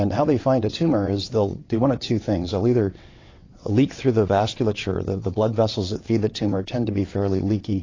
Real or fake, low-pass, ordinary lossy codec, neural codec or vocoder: fake; 7.2 kHz; AAC, 32 kbps; vocoder, 22.05 kHz, 80 mel bands, WaveNeXt